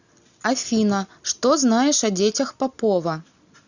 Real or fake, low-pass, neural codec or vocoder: real; 7.2 kHz; none